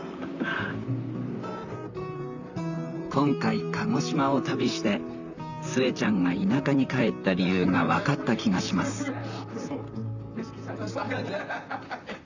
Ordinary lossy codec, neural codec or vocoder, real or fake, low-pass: none; vocoder, 44.1 kHz, 128 mel bands, Pupu-Vocoder; fake; 7.2 kHz